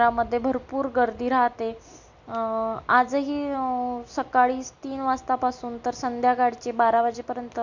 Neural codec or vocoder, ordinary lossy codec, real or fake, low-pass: none; none; real; 7.2 kHz